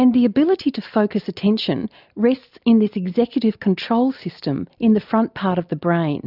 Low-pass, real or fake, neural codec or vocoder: 5.4 kHz; real; none